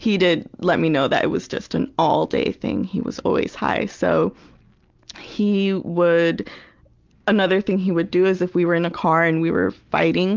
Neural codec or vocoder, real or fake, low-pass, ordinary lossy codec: none; real; 7.2 kHz; Opus, 32 kbps